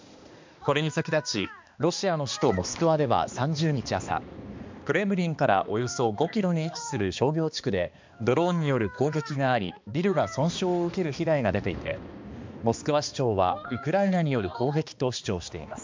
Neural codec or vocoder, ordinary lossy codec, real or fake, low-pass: codec, 16 kHz, 2 kbps, X-Codec, HuBERT features, trained on balanced general audio; MP3, 64 kbps; fake; 7.2 kHz